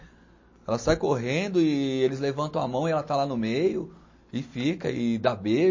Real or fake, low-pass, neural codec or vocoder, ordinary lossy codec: real; 7.2 kHz; none; MP3, 32 kbps